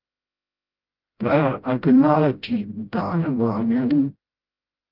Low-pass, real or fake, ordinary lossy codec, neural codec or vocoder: 5.4 kHz; fake; Opus, 32 kbps; codec, 16 kHz, 0.5 kbps, FreqCodec, smaller model